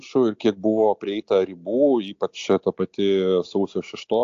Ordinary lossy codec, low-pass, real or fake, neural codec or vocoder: AAC, 48 kbps; 7.2 kHz; real; none